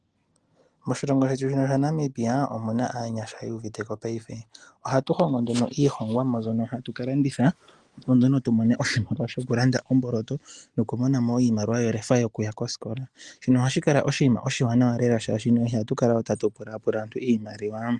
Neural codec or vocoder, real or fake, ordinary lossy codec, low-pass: none; real; Opus, 24 kbps; 10.8 kHz